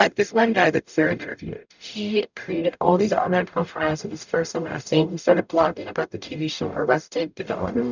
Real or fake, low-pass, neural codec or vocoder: fake; 7.2 kHz; codec, 44.1 kHz, 0.9 kbps, DAC